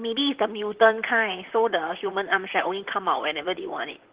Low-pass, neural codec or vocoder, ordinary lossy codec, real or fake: 3.6 kHz; vocoder, 44.1 kHz, 128 mel bands, Pupu-Vocoder; Opus, 16 kbps; fake